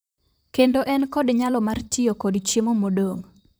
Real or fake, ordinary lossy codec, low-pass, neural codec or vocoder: fake; none; none; vocoder, 44.1 kHz, 128 mel bands, Pupu-Vocoder